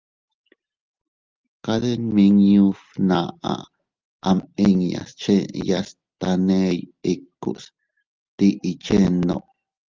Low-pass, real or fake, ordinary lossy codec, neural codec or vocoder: 7.2 kHz; real; Opus, 32 kbps; none